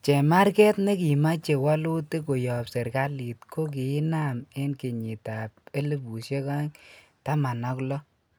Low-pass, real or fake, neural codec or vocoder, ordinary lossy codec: none; real; none; none